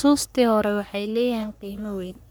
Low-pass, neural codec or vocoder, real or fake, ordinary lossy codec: none; codec, 44.1 kHz, 3.4 kbps, Pupu-Codec; fake; none